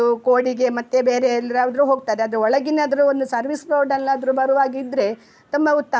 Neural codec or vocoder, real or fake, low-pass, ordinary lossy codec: none; real; none; none